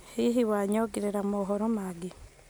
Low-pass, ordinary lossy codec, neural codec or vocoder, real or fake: none; none; none; real